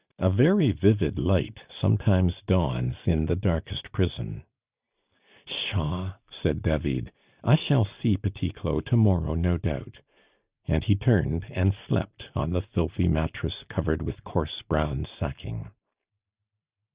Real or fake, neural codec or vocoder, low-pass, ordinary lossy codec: real; none; 3.6 kHz; Opus, 64 kbps